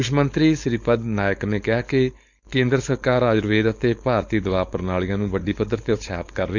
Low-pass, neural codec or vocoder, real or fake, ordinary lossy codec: 7.2 kHz; codec, 16 kHz, 4.8 kbps, FACodec; fake; none